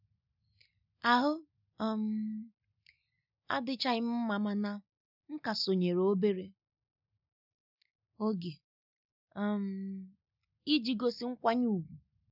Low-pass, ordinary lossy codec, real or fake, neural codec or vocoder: 5.4 kHz; none; real; none